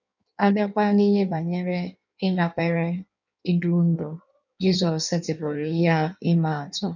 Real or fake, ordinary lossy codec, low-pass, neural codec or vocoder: fake; none; 7.2 kHz; codec, 16 kHz in and 24 kHz out, 1.1 kbps, FireRedTTS-2 codec